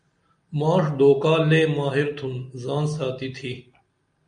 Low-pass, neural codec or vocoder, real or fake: 9.9 kHz; none; real